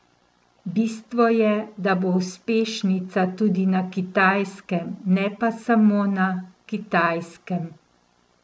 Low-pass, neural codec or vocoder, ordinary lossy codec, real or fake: none; none; none; real